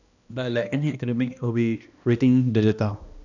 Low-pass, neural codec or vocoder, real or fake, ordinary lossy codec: 7.2 kHz; codec, 16 kHz, 1 kbps, X-Codec, HuBERT features, trained on balanced general audio; fake; none